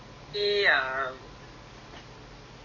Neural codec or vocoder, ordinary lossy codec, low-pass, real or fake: none; MP3, 32 kbps; 7.2 kHz; real